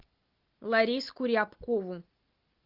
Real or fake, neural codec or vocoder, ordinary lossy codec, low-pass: real; none; Opus, 24 kbps; 5.4 kHz